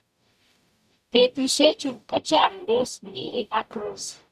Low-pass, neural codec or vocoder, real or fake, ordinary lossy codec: 14.4 kHz; codec, 44.1 kHz, 0.9 kbps, DAC; fake; none